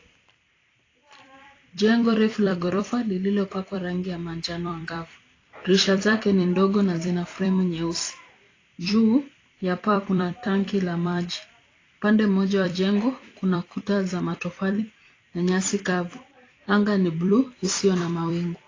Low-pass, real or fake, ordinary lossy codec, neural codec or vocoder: 7.2 kHz; fake; AAC, 32 kbps; vocoder, 44.1 kHz, 128 mel bands every 256 samples, BigVGAN v2